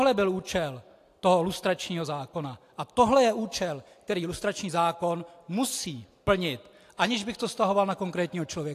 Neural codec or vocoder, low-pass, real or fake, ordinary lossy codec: none; 14.4 kHz; real; AAC, 64 kbps